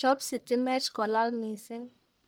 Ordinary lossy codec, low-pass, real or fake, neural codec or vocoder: none; none; fake; codec, 44.1 kHz, 1.7 kbps, Pupu-Codec